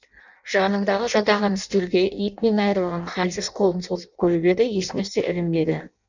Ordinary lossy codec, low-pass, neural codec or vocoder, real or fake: none; 7.2 kHz; codec, 16 kHz in and 24 kHz out, 0.6 kbps, FireRedTTS-2 codec; fake